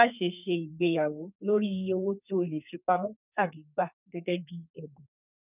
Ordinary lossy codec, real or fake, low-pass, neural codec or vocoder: none; fake; 3.6 kHz; codec, 32 kHz, 1.9 kbps, SNAC